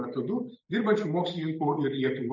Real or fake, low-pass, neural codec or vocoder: real; 7.2 kHz; none